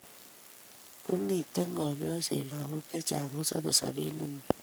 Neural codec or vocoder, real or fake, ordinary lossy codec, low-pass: codec, 44.1 kHz, 3.4 kbps, Pupu-Codec; fake; none; none